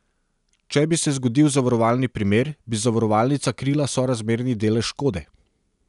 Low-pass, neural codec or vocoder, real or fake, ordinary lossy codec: 10.8 kHz; none; real; none